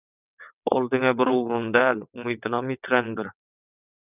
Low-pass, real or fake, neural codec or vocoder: 3.6 kHz; fake; vocoder, 22.05 kHz, 80 mel bands, WaveNeXt